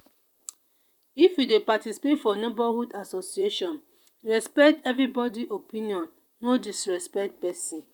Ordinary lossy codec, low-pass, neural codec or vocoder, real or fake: none; 19.8 kHz; vocoder, 44.1 kHz, 128 mel bands, Pupu-Vocoder; fake